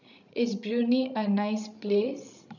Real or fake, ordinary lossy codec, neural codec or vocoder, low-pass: fake; none; codec, 16 kHz, 16 kbps, FreqCodec, larger model; 7.2 kHz